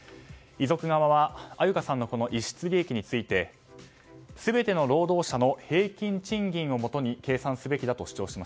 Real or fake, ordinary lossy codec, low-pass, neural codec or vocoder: real; none; none; none